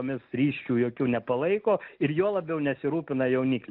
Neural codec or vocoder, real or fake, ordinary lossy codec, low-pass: none; real; Opus, 24 kbps; 5.4 kHz